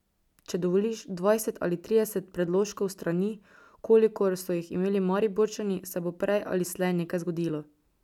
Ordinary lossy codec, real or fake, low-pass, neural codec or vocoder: none; real; 19.8 kHz; none